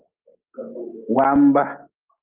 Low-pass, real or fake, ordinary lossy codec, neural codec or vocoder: 3.6 kHz; real; Opus, 32 kbps; none